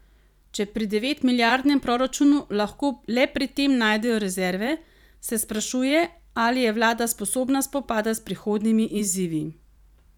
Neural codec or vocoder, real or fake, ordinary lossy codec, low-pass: vocoder, 44.1 kHz, 128 mel bands every 512 samples, BigVGAN v2; fake; none; 19.8 kHz